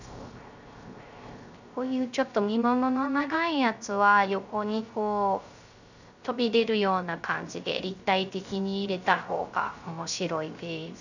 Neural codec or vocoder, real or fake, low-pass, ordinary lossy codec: codec, 16 kHz, 0.3 kbps, FocalCodec; fake; 7.2 kHz; none